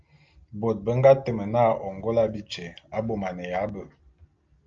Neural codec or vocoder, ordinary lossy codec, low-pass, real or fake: none; Opus, 24 kbps; 7.2 kHz; real